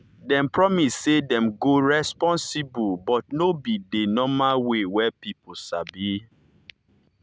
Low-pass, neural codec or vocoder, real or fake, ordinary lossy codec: none; none; real; none